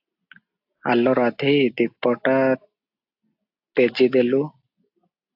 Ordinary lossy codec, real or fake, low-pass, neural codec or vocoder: AAC, 48 kbps; real; 5.4 kHz; none